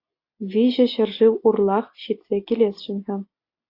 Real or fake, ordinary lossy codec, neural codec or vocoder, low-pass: real; AAC, 32 kbps; none; 5.4 kHz